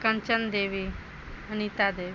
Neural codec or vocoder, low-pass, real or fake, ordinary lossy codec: none; none; real; none